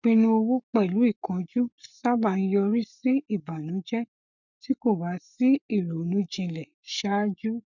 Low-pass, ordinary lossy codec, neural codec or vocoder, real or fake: 7.2 kHz; none; codec, 44.1 kHz, 7.8 kbps, Pupu-Codec; fake